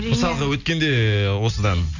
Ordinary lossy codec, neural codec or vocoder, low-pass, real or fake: none; none; 7.2 kHz; real